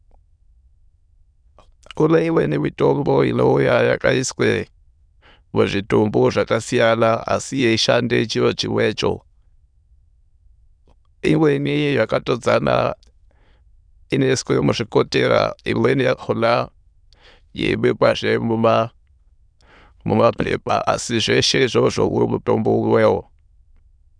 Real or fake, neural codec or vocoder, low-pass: fake; autoencoder, 22.05 kHz, a latent of 192 numbers a frame, VITS, trained on many speakers; 9.9 kHz